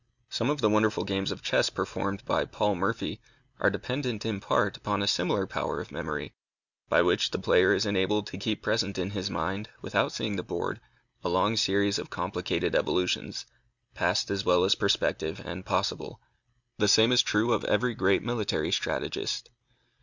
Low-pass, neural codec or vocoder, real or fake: 7.2 kHz; none; real